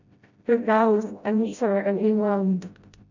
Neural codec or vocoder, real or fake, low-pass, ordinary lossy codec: codec, 16 kHz, 0.5 kbps, FreqCodec, smaller model; fake; 7.2 kHz; none